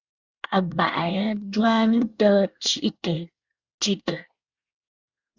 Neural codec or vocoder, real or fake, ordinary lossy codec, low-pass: codec, 24 kHz, 1 kbps, SNAC; fake; Opus, 64 kbps; 7.2 kHz